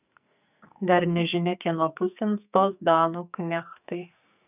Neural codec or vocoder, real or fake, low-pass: codec, 44.1 kHz, 2.6 kbps, SNAC; fake; 3.6 kHz